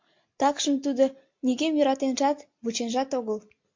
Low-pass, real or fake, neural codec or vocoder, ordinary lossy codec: 7.2 kHz; real; none; MP3, 48 kbps